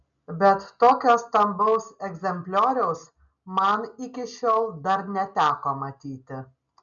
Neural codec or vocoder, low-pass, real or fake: none; 7.2 kHz; real